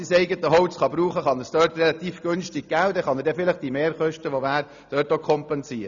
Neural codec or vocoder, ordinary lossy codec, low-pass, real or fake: none; none; 7.2 kHz; real